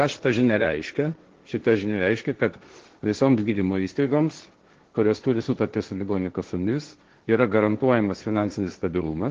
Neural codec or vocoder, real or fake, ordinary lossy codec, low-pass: codec, 16 kHz, 1.1 kbps, Voila-Tokenizer; fake; Opus, 16 kbps; 7.2 kHz